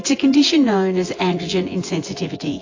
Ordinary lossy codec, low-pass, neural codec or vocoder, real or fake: AAC, 32 kbps; 7.2 kHz; vocoder, 24 kHz, 100 mel bands, Vocos; fake